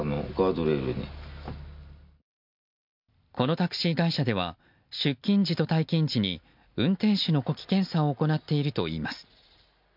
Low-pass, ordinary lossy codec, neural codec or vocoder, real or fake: 5.4 kHz; MP3, 48 kbps; none; real